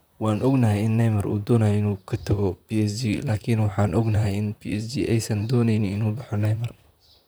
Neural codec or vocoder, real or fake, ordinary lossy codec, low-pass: vocoder, 44.1 kHz, 128 mel bands, Pupu-Vocoder; fake; none; none